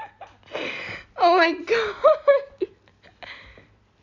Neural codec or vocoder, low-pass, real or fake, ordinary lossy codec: none; 7.2 kHz; real; none